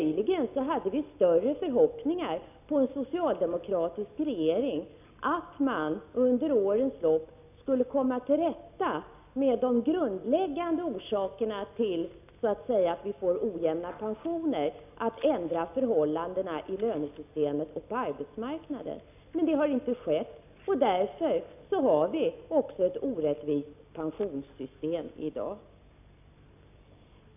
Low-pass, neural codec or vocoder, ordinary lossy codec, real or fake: 3.6 kHz; none; none; real